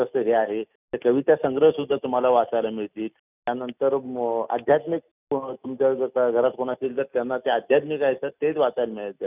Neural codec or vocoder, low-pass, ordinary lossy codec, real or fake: none; 3.6 kHz; none; real